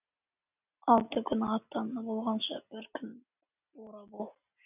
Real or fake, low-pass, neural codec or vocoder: real; 3.6 kHz; none